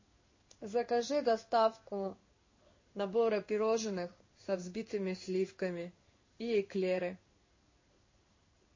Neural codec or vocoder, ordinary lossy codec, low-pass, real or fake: codec, 16 kHz in and 24 kHz out, 1 kbps, XY-Tokenizer; MP3, 32 kbps; 7.2 kHz; fake